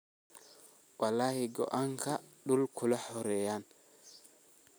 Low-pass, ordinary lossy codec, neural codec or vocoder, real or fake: none; none; none; real